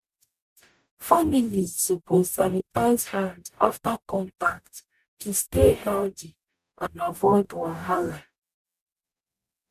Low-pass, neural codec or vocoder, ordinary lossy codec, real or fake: 14.4 kHz; codec, 44.1 kHz, 0.9 kbps, DAC; none; fake